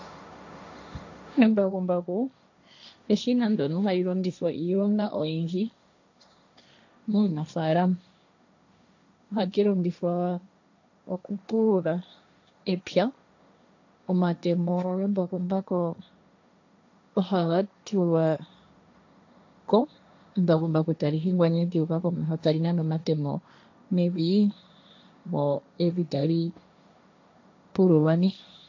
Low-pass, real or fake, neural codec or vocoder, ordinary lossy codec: 7.2 kHz; fake; codec, 16 kHz, 1.1 kbps, Voila-Tokenizer; AAC, 48 kbps